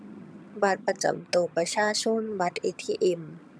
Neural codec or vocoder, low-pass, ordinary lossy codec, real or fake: vocoder, 22.05 kHz, 80 mel bands, HiFi-GAN; none; none; fake